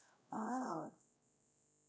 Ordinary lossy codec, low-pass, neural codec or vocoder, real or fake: none; none; codec, 16 kHz, 0.5 kbps, X-Codec, WavLM features, trained on Multilingual LibriSpeech; fake